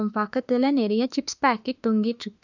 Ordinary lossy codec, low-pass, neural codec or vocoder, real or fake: none; 7.2 kHz; autoencoder, 48 kHz, 32 numbers a frame, DAC-VAE, trained on Japanese speech; fake